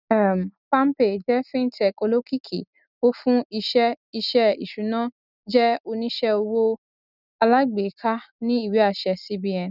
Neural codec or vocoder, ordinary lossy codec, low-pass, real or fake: none; none; 5.4 kHz; real